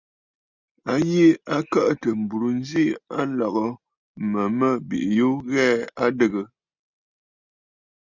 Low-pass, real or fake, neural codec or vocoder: 7.2 kHz; real; none